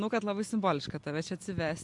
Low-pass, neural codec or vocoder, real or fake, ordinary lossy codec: 10.8 kHz; none; real; MP3, 64 kbps